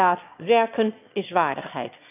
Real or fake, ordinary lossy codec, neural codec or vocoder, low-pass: fake; none; autoencoder, 22.05 kHz, a latent of 192 numbers a frame, VITS, trained on one speaker; 3.6 kHz